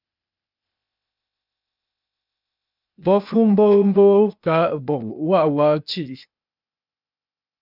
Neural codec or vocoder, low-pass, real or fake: codec, 16 kHz, 0.8 kbps, ZipCodec; 5.4 kHz; fake